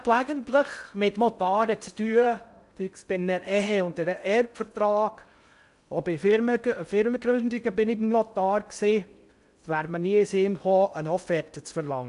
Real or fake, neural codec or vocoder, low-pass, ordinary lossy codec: fake; codec, 16 kHz in and 24 kHz out, 0.6 kbps, FocalCodec, streaming, 4096 codes; 10.8 kHz; MP3, 96 kbps